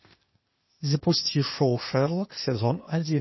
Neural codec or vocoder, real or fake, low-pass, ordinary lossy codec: codec, 16 kHz, 0.8 kbps, ZipCodec; fake; 7.2 kHz; MP3, 24 kbps